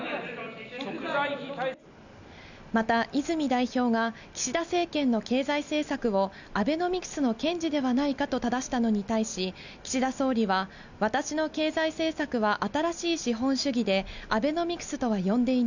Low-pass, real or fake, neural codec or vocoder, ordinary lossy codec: 7.2 kHz; real; none; none